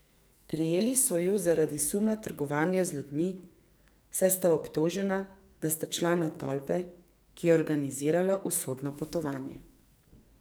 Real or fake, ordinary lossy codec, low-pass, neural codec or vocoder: fake; none; none; codec, 44.1 kHz, 2.6 kbps, SNAC